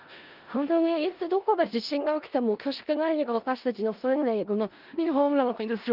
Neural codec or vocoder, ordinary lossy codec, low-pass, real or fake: codec, 16 kHz in and 24 kHz out, 0.4 kbps, LongCat-Audio-Codec, four codebook decoder; Opus, 24 kbps; 5.4 kHz; fake